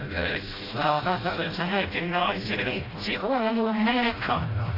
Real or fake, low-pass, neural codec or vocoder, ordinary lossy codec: fake; 5.4 kHz; codec, 16 kHz, 0.5 kbps, FreqCodec, smaller model; AAC, 24 kbps